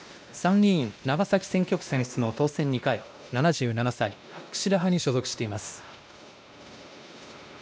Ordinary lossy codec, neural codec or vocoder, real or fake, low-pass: none; codec, 16 kHz, 1 kbps, X-Codec, WavLM features, trained on Multilingual LibriSpeech; fake; none